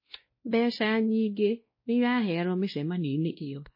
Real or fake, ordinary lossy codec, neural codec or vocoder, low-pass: fake; MP3, 24 kbps; codec, 16 kHz, 1 kbps, X-Codec, WavLM features, trained on Multilingual LibriSpeech; 5.4 kHz